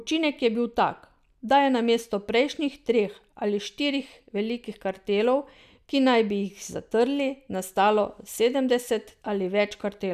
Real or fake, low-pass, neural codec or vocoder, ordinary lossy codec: real; 14.4 kHz; none; none